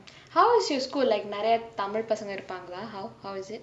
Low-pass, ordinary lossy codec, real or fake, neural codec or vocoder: none; none; real; none